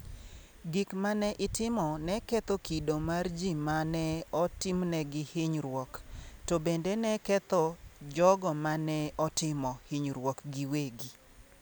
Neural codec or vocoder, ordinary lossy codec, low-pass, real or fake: none; none; none; real